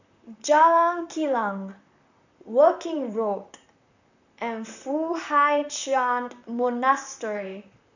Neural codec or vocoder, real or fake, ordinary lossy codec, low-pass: vocoder, 44.1 kHz, 128 mel bands, Pupu-Vocoder; fake; none; 7.2 kHz